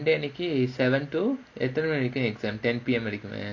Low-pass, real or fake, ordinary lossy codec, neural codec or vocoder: 7.2 kHz; real; none; none